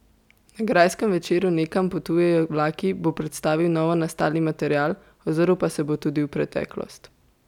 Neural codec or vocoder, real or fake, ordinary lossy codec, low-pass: none; real; none; 19.8 kHz